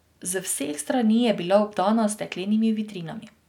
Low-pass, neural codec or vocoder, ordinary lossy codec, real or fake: 19.8 kHz; none; none; real